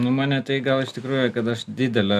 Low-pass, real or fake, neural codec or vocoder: 14.4 kHz; real; none